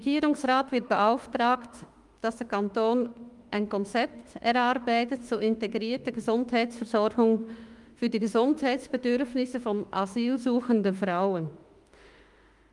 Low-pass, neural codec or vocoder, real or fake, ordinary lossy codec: 10.8 kHz; autoencoder, 48 kHz, 32 numbers a frame, DAC-VAE, trained on Japanese speech; fake; Opus, 32 kbps